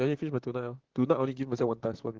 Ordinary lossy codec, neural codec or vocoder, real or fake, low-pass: Opus, 16 kbps; vocoder, 44.1 kHz, 128 mel bands, Pupu-Vocoder; fake; 7.2 kHz